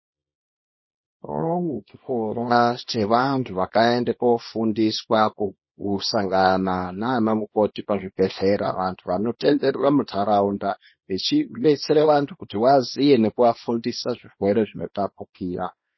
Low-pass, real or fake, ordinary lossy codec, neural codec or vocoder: 7.2 kHz; fake; MP3, 24 kbps; codec, 24 kHz, 0.9 kbps, WavTokenizer, small release